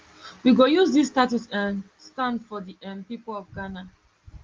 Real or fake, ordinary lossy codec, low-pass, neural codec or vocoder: real; Opus, 32 kbps; 7.2 kHz; none